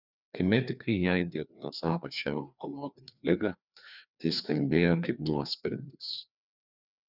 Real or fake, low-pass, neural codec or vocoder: fake; 5.4 kHz; codec, 16 kHz, 2 kbps, FreqCodec, larger model